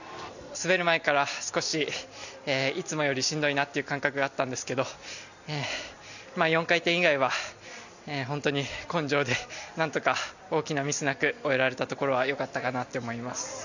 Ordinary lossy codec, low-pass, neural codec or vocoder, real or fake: none; 7.2 kHz; none; real